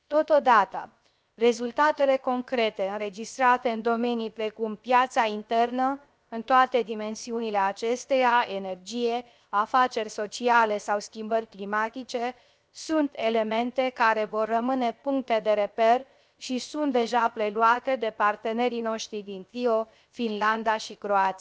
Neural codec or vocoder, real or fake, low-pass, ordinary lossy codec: codec, 16 kHz, 0.7 kbps, FocalCodec; fake; none; none